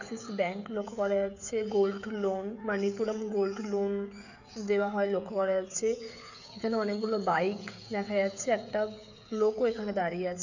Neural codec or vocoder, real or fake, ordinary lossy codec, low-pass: codec, 16 kHz, 16 kbps, FreqCodec, smaller model; fake; none; 7.2 kHz